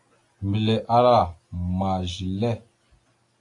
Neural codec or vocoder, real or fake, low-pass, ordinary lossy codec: none; real; 10.8 kHz; AAC, 48 kbps